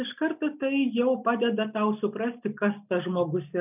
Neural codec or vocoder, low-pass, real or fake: none; 3.6 kHz; real